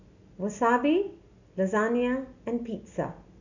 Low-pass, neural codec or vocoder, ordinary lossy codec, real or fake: 7.2 kHz; none; none; real